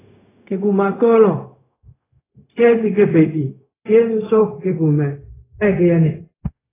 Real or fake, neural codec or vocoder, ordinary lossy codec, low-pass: fake; codec, 16 kHz, 0.4 kbps, LongCat-Audio-Codec; AAC, 16 kbps; 3.6 kHz